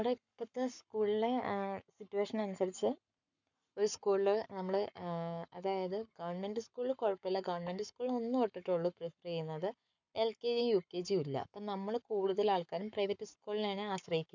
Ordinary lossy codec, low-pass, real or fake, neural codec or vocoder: none; 7.2 kHz; fake; codec, 44.1 kHz, 7.8 kbps, Pupu-Codec